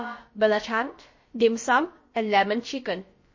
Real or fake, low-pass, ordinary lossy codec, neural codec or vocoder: fake; 7.2 kHz; MP3, 32 kbps; codec, 16 kHz, about 1 kbps, DyCAST, with the encoder's durations